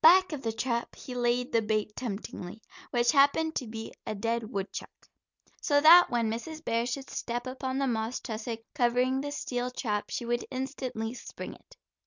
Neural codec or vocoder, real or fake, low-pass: none; real; 7.2 kHz